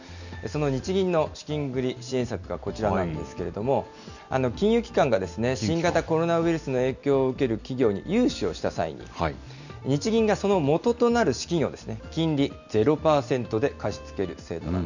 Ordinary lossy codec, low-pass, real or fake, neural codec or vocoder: none; 7.2 kHz; real; none